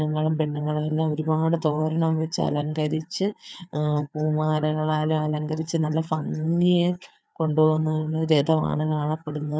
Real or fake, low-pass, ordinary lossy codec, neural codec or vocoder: fake; none; none; codec, 16 kHz, 4 kbps, FreqCodec, larger model